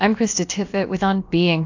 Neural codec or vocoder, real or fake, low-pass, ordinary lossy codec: codec, 16 kHz, about 1 kbps, DyCAST, with the encoder's durations; fake; 7.2 kHz; AAC, 48 kbps